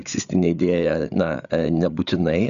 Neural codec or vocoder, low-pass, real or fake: codec, 16 kHz, 8 kbps, FreqCodec, larger model; 7.2 kHz; fake